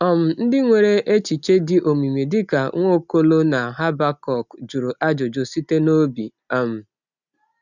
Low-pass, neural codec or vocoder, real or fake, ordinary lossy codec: 7.2 kHz; none; real; none